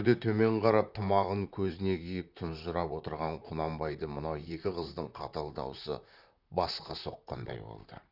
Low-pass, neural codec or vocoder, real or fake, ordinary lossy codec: 5.4 kHz; vocoder, 44.1 kHz, 128 mel bands, Pupu-Vocoder; fake; none